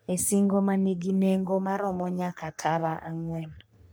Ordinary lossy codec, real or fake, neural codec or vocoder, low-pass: none; fake; codec, 44.1 kHz, 3.4 kbps, Pupu-Codec; none